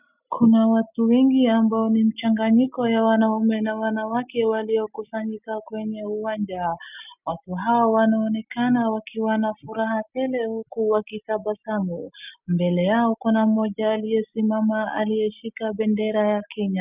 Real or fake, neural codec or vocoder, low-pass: real; none; 3.6 kHz